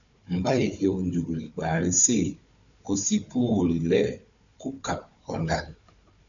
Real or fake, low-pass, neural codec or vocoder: fake; 7.2 kHz; codec, 16 kHz, 4 kbps, FunCodec, trained on Chinese and English, 50 frames a second